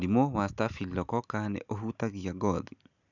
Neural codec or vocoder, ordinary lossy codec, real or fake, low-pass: none; none; real; 7.2 kHz